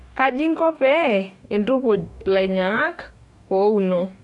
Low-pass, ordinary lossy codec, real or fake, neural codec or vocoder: 10.8 kHz; none; fake; codec, 44.1 kHz, 2.6 kbps, DAC